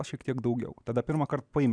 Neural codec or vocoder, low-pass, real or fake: none; 9.9 kHz; real